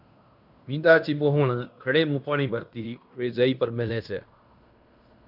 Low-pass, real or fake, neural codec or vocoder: 5.4 kHz; fake; codec, 16 kHz in and 24 kHz out, 0.9 kbps, LongCat-Audio-Codec, fine tuned four codebook decoder